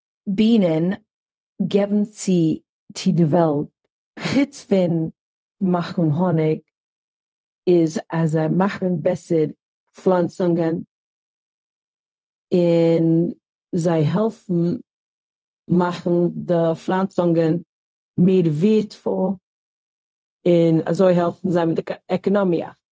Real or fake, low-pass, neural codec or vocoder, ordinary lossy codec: fake; none; codec, 16 kHz, 0.4 kbps, LongCat-Audio-Codec; none